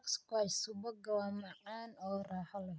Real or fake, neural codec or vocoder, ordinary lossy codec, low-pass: real; none; none; none